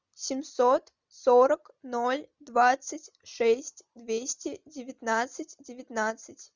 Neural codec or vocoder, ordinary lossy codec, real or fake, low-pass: none; Opus, 64 kbps; real; 7.2 kHz